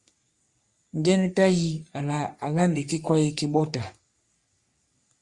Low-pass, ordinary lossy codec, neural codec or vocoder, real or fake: 10.8 kHz; Opus, 64 kbps; codec, 44.1 kHz, 3.4 kbps, Pupu-Codec; fake